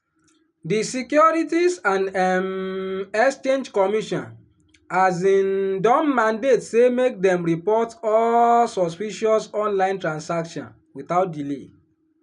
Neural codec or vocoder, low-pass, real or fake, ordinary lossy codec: none; 10.8 kHz; real; none